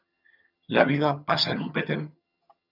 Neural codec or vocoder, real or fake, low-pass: vocoder, 22.05 kHz, 80 mel bands, HiFi-GAN; fake; 5.4 kHz